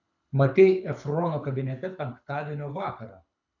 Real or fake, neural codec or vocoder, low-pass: fake; codec, 24 kHz, 6 kbps, HILCodec; 7.2 kHz